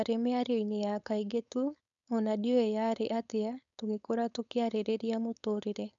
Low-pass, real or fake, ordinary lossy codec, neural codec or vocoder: 7.2 kHz; fake; none; codec, 16 kHz, 4.8 kbps, FACodec